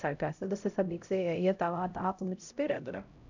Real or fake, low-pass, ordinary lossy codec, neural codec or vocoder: fake; 7.2 kHz; none; codec, 16 kHz, 0.5 kbps, X-Codec, HuBERT features, trained on LibriSpeech